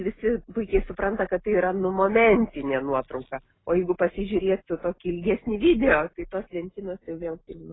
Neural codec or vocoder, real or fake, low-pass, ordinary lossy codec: none; real; 7.2 kHz; AAC, 16 kbps